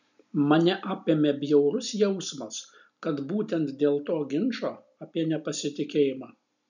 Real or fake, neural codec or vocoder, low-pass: real; none; 7.2 kHz